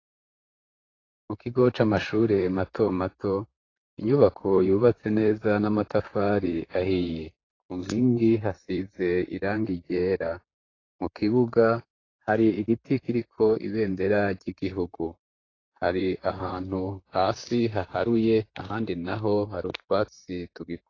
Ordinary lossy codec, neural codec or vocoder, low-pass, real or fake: AAC, 32 kbps; vocoder, 44.1 kHz, 128 mel bands, Pupu-Vocoder; 7.2 kHz; fake